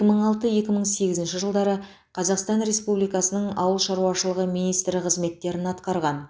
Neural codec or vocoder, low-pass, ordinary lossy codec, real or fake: none; none; none; real